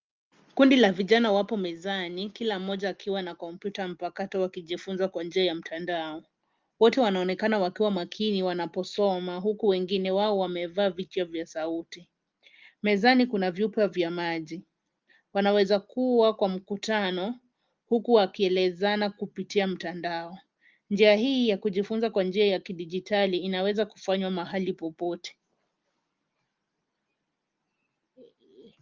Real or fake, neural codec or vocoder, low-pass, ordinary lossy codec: real; none; 7.2 kHz; Opus, 32 kbps